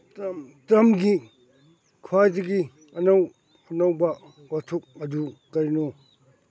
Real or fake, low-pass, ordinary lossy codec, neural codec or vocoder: real; none; none; none